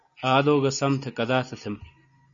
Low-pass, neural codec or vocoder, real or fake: 7.2 kHz; none; real